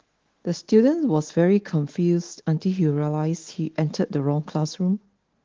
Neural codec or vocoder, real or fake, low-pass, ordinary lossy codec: autoencoder, 48 kHz, 128 numbers a frame, DAC-VAE, trained on Japanese speech; fake; 7.2 kHz; Opus, 16 kbps